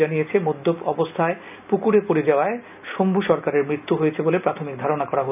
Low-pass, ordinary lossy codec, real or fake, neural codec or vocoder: 3.6 kHz; none; real; none